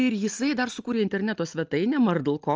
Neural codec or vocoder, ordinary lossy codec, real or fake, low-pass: none; Opus, 24 kbps; real; 7.2 kHz